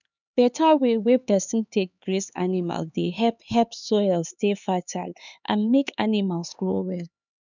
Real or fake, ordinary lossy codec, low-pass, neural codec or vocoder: fake; none; 7.2 kHz; codec, 16 kHz, 4 kbps, X-Codec, HuBERT features, trained on LibriSpeech